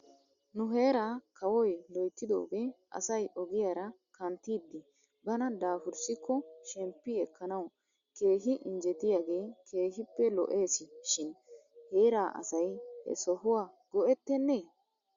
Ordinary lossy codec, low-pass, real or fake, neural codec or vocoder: Opus, 64 kbps; 7.2 kHz; real; none